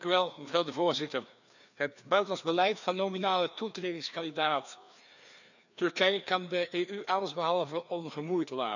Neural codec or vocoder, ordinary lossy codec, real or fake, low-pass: codec, 16 kHz, 2 kbps, FreqCodec, larger model; none; fake; 7.2 kHz